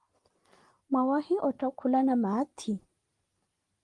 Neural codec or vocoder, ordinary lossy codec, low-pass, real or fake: none; Opus, 24 kbps; 10.8 kHz; real